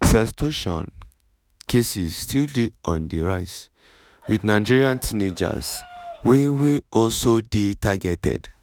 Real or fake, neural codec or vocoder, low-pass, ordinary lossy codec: fake; autoencoder, 48 kHz, 32 numbers a frame, DAC-VAE, trained on Japanese speech; none; none